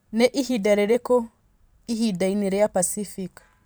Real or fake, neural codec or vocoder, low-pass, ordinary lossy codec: fake; vocoder, 44.1 kHz, 128 mel bands every 512 samples, BigVGAN v2; none; none